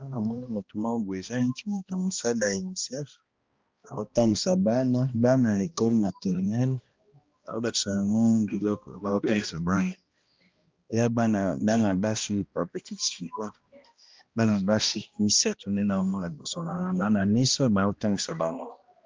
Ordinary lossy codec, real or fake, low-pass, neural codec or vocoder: Opus, 24 kbps; fake; 7.2 kHz; codec, 16 kHz, 1 kbps, X-Codec, HuBERT features, trained on balanced general audio